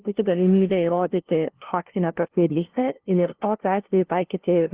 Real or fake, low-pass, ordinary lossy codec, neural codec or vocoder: fake; 3.6 kHz; Opus, 16 kbps; codec, 16 kHz, 0.5 kbps, FunCodec, trained on LibriTTS, 25 frames a second